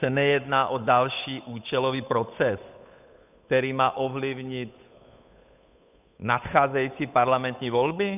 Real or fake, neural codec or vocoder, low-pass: fake; codec, 16 kHz, 8 kbps, FunCodec, trained on Chinese and English, 25 frames a second; 3.6 kHz